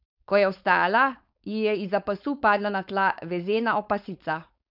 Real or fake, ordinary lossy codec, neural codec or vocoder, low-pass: fake; none; codec, 16 kHz, 4.8 kbps, FACodec; 5.4 kHz